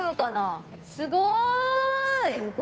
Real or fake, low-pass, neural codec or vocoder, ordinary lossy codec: fake; none; codec, 16 kHz, 2 kbps, FunCodec, trained on Chinese and English, 25 frames a second; none